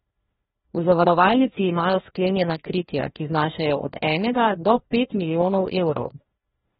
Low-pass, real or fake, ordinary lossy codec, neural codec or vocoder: 7.2 kHz; fake; AAC, 16 kbps; codec, 16 kHz, 1 kbps, FreqCodec, larger model